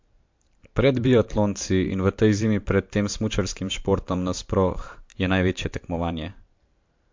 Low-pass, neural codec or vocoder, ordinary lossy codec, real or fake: 7.2 kHz; vocoder, 44.1 kHz, 128 mel bands every 512 samples, BigVGAN v2; MP3, 48 kbps; fake